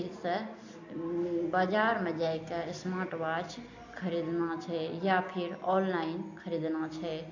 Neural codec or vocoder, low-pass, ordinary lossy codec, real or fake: none; 7.2 kHz; AAC, 48 kbps; real